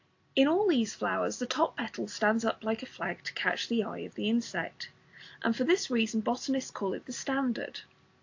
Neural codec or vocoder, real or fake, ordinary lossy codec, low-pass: none; real; AAC, 48 kbps; 7.2 kHz